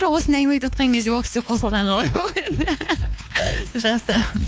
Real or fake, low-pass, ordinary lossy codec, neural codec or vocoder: fake; none; none; codec, 16 kHz, 2 kbps, X-Codec, WavLM features, trained on Multilingual LibriSpeech